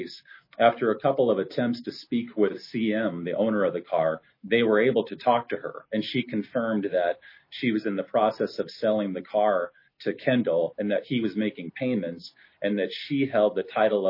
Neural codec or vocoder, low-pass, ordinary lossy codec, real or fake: none; 5.4 kHz; MP3, 24 kbps; real